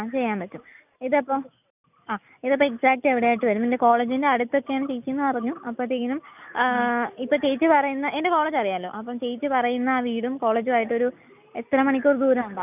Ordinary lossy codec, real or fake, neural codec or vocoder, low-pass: none; real; none; 3.6 kHz